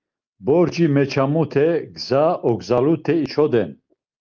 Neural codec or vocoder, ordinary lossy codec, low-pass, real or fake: none; Opus, 24 kbps; 7.2 kHz; real